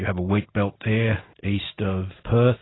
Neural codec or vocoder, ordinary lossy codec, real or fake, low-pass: none; AAC, 16 kbps; real; 7.2 kHz